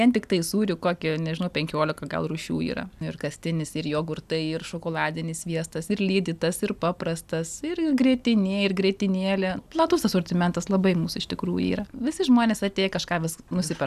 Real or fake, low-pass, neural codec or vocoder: real; 14.4 kHz; none